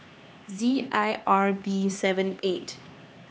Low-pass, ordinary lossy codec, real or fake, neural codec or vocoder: none; none; fake; codec, 16 kHz, 2 kbps, X-Codec, HuBERT features, trained on LibriSpeech